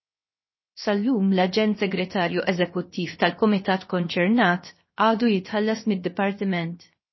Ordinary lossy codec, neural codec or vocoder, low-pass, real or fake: MP3, 24 kbps; codec, 16 kHz, 0.7 kbps, FocalCodec; 7.2 kHz; fake